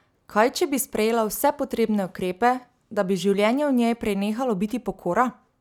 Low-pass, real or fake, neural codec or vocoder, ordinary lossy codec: 19.8 kHz; real; none; none